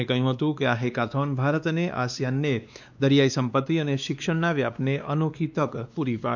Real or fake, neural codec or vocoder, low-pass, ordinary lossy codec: fake; codec, 16 kHz, 2 kbps, X-Codec, WavLM features, trained on Multilingual LibriSpeech; 7.2 kHz; none